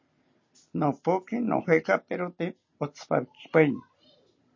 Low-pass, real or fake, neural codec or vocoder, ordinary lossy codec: 7.2 kHz; real; none; MP3, 32 kbps